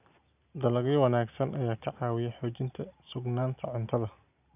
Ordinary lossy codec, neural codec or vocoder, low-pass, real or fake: none; none; 3.6 kHz; real